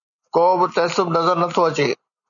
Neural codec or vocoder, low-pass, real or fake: none; 7.2 kHz; real